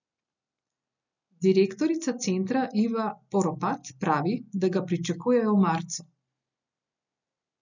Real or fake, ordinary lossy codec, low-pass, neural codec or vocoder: real; none; 7.2 kHz; none